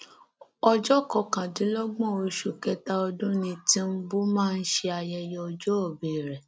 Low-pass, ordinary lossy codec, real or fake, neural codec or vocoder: none; none; real; none